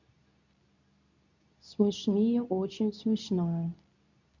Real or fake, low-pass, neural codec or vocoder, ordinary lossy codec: fake; 7.2 kHz; codec, 24 kHz, 0.9 kbps, WavTokenizer, medium speech release version 2; none